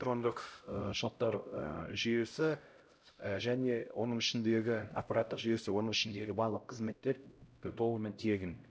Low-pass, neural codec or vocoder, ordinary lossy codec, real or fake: none; codec, 16 kHz, 0.5 kbps, X-Codec, HuBERT features, trained on LibriSpeech; none; fake